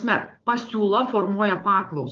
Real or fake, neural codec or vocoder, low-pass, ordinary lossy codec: fake; codec, 16 kHz, 4 kbps, FunCodec, trained on Chinese and English, 50 frames a second; 7.2 kHz; Opus, 32 kbps